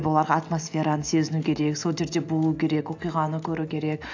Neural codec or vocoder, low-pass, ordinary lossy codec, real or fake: none; 7.2 kHz; AAC, 48 kbps; real